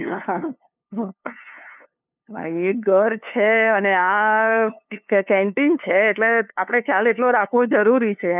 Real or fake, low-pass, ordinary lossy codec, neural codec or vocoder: fake; 3.6 kHz; none; codec, 16 kHz, 2 kbps, FunCodec, trained on LibriTTS, 25 frames a second